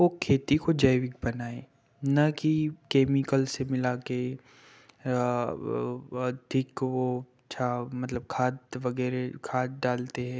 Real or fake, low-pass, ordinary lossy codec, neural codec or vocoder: real; none; none; none